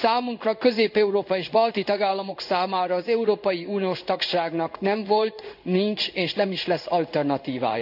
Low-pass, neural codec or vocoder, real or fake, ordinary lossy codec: 5.4 kHz; codec, 16 kHz in and 24 kHz out, 1 kbps, XY-Tokenizer; fake; none